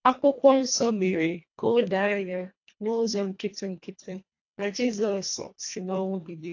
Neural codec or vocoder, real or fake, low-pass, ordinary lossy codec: codec, 24 kHz, 1.5 kbps, HILCodec; fake; 7.2 kHz; AAC, 48 kbps